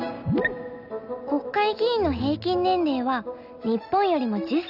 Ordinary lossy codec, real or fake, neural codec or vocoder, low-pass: none; real; none; 5.4 kHz